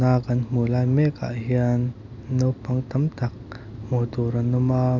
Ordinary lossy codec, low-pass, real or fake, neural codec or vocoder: none; 7.2 kHz; real; none